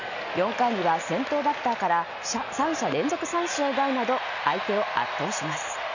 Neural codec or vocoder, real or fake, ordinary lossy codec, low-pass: none; real; none; 7.2 kHz